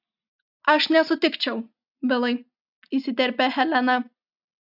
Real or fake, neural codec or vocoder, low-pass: real; none; 5.4 kHz